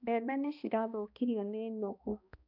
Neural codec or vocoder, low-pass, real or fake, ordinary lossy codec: codec, 16 kHz, 1 kbps, X-Codec, HuBERT features, trained on balanced general audio; 5.4 kHz; fake; none